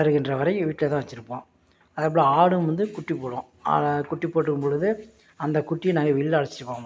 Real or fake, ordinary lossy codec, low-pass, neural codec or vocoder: real; none; none; none